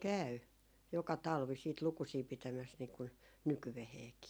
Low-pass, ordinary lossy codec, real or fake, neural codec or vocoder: none; none; real; none